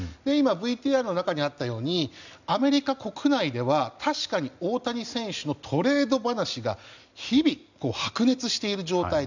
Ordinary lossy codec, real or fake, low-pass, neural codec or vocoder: none; real; 7.2 kHz; none